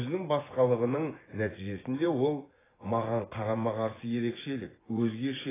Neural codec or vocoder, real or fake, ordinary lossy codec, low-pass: vocoder, 24 kHz, 100 mel bands, Vocos; fake; AAC, 16 kbps; 3.6 kHz